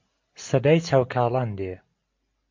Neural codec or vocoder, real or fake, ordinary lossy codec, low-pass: none; real; MP3, 48 kbps; 7.2 kHz